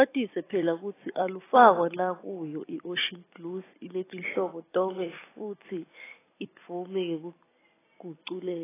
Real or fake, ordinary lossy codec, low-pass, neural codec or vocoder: fake; AAC, 16 kbps; 3.6 kHz; vocoder, 44.1 kHz, 128 mel bands every 256 samples, BigVGAN v2